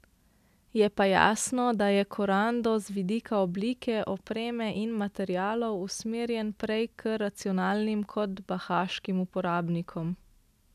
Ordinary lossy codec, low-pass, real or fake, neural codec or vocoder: none; 14.4 kHz; real; none